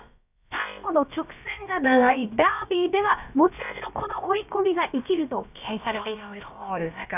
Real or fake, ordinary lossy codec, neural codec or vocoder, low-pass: fake; none; codec, 16 kHz, about 1 kbps, DyCAST, with the encoder's durations; 3.6 kHz